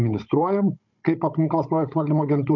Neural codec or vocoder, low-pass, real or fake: codec, 16 kHz, 16 kbps, FunCodec, trained on Chinese and English, 50 frames a second; 7.2 kHz; fake